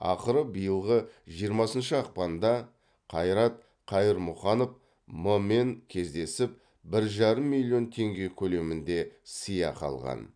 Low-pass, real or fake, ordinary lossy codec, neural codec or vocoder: 9.9 kHz; real; none; none